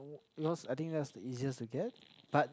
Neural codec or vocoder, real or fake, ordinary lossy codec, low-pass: none; real; none; none